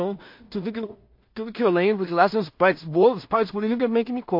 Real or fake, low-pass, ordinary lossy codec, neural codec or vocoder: fake; 5.4 kHz; MP3, 32 kbps; codec, 16 kHz in and 24 kHz out, 0.4 kbps, LongCat-Audio-Codec, two codebook decoder